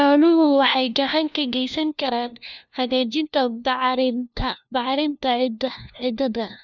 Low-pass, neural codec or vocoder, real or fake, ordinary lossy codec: 7.2 kHz; codec, 16 kHz, 1 kbps, FunCodec, trained on LibriTTS, 50 frames a second; fake; none